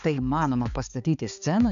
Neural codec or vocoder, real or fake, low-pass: codec, 16 kHz, 4 kbps, X-Codec, HuBERT features, trained on balanced general audio; fake; 7.2 kHz